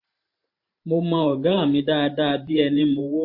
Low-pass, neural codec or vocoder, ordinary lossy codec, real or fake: 5.4 kHz; vocoder, 44.1 kHz, 128 mel bands every 512 samples, BigVGAN v2; MP3, 32 kbps; fake